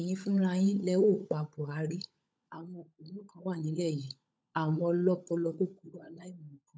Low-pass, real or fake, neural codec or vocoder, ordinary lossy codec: none; fake; codec, 16 kHz, 16 kbps, FunCodec, trained on LibriTTS, 50 frames a second; none